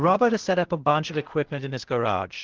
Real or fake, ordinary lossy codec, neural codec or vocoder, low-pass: fake; Opus, 16 kbps; codec, 16 kHz, 0.8 kbps, ZipCodec; 7.2 kHz